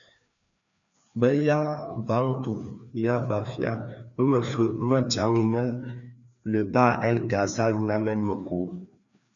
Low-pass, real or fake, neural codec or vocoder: 7.2 kHz; fake; codec, 16 kHz, 2 kbps, FreqCodec, larger model